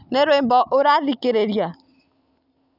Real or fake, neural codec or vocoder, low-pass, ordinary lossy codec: real; none; 5.4 kHz; none